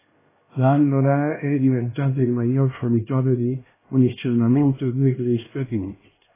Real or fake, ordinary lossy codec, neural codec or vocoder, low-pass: fake; AAC, 16 kbps; codec, 16 kHz, 1 kbps, X-Codec, HuBERT features, trained on LibriSpeech; 3.6 kHz